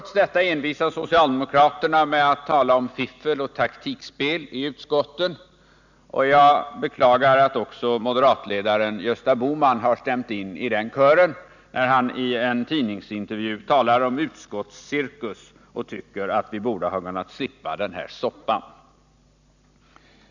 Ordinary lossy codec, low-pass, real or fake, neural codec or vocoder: none; 7.2 kHz; real; none